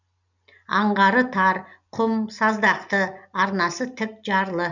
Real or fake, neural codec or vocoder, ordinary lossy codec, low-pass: real; none; none; 7.2 kHz